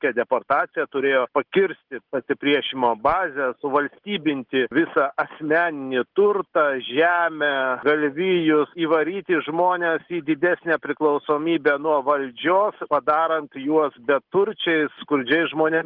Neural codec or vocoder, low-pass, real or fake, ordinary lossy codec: none; 5.4 kHz; real; Opus, 16 kbps